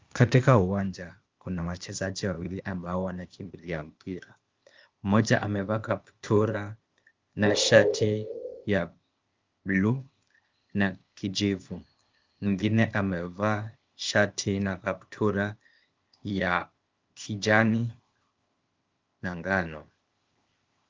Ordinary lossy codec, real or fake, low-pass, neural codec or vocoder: Opus, 24 kbps; fake; 7.2 kHz; codec, 16 kHz, 0.8 kbps, ZipCodec